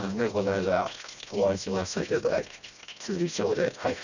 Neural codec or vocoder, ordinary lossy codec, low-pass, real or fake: codec, 16 kHz, 1 kbps, FreqCodec, smaller model; none; 7.2 kHz; fake